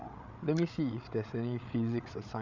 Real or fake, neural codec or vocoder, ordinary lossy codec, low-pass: fake; codec, 16 kHz, 8 kbps, FreqCodec, larger model; none; 7.2 kHz